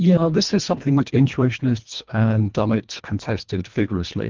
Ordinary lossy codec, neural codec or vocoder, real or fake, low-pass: Opus, 24 kbps; codec, 24 kHz, 1.5 kbps, HILCodec; fake; 7.2 kHz